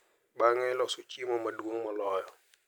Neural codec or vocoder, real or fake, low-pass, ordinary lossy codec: none; real; none; none